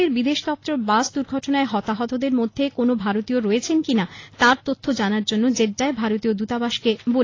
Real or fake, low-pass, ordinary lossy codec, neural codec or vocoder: real; 7.2 kHz; AAC, 32 kbps; none